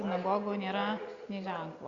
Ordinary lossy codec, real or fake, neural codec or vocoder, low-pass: Opus, 64 kbps; real; none; 7.2 kHz